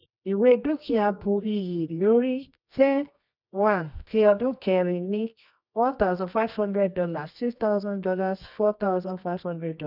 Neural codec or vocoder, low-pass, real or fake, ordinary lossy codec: codec, 24 kHz, 0.9 kbps, WavTokenizer, medium music audio release; 5.4 kHz; fake; none